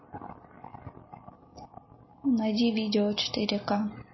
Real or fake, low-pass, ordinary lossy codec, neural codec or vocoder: real; 7.2 kHz; MP3, 24 kbps; none